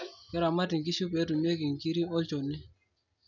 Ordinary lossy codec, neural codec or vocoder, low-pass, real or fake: none; none; 7.2 kHz; real